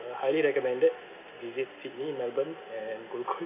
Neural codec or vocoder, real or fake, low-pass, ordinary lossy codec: none; real; 3.6 kHz; none